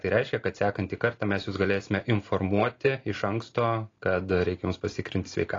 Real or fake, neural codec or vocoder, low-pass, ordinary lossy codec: real; none; 7.2 kHz; AAC, 32 kbps